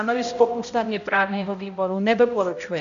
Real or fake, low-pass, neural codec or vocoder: fake; 7.2 kHz; codec, 16 kHz, 0.5 kbps, X-Codec, HuBERT features, trained on balanced general audio